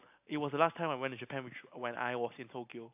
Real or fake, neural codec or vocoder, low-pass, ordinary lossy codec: real; none; 3.6 kHz; AAC, 32 kbps